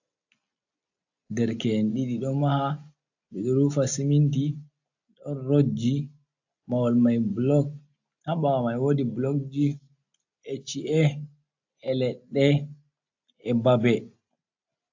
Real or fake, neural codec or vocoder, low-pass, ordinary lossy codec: real; none; 7.2 kHz; AAC, 48 kbps